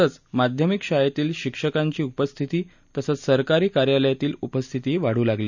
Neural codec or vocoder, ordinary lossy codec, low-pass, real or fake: none; none; 7.2 kHz; real